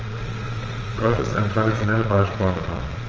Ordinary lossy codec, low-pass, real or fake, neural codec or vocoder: Opus, 24 kbps; 7.2 kHz; fake; vocoder, 22.05 kHz, 80 mel bands, Vocos